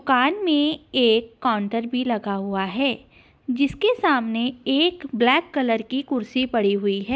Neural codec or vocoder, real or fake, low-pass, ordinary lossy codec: none; real; none; none